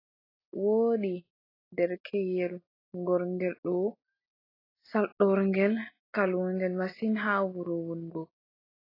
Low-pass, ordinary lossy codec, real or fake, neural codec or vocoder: 5.4 kHz; AAC, 24 kbps; real; none